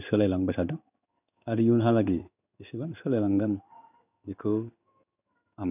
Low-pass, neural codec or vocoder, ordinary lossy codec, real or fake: 3.6 kHz; codec, 16 kHz in and 24 kHz out, 1 kbps, XY-Tokenizer; none; fake